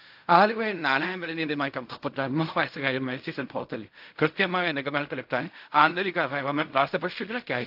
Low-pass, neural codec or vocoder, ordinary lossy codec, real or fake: 5.4 kHz; codec, 16 kHz in and 24 kHz out, 0.4 kbps, LongCat-Audio-Codec, fine tuned four codebook decoder; AAC, 48 kbps; fake